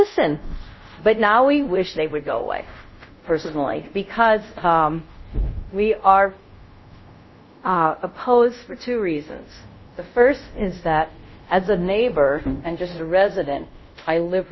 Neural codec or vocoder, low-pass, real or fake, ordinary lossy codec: codec, 24 kHz, 0.5 kbps, DualCodec; 7.2 kHz; fake; MP3, 24 kbps